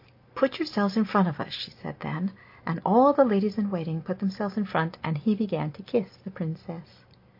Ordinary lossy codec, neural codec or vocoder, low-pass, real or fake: MP3, 32 kbps; none; 5.4 kHz; real